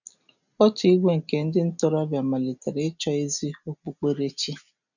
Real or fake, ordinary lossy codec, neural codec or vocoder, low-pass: real; none; none; 7.2 kHz